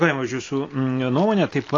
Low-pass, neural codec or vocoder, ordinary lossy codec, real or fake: 7.2 kHz; none; AAC, 32 kbps; real